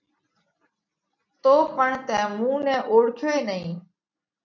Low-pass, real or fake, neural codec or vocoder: 7.2 kHz; real; none